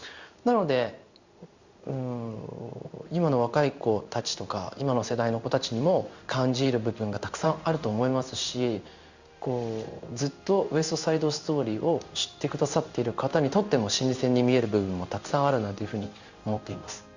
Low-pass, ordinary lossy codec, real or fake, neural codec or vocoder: 7.2 kHz; Opus, 64 kbps; fake; codec, 16 kHz in and 24 kHz out, 1 kbps, XY-Tokenizer